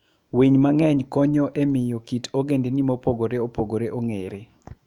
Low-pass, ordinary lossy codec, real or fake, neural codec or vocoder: 19.8 kHz; Opus, 64 kbps; fake; autoencoder, 48 kHz, 128 numbers a frame, DAC-VAE, trained on Japanese speech